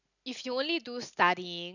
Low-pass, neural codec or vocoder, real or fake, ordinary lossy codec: 7.2 kHz; none; real; none